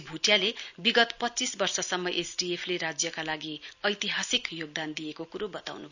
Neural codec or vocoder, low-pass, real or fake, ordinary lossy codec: none; 7.2 kHz; real; none